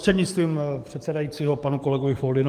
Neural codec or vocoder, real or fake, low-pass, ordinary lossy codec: codec, 44.1 kHz, 7.8 kbps, DAC; fake; 14.4 kHz; Opus, 32 kbps